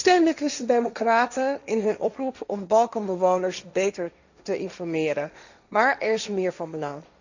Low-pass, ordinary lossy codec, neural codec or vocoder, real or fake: 7.2 kHz; none; codec, 16 kHz, 1.1 kbps, Voila-Tokenizer; fake